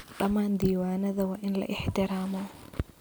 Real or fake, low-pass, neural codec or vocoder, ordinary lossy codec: real; none; none; none